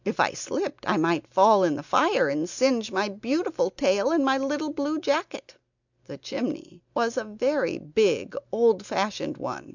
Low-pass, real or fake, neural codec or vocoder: 7.2 kHz; real; none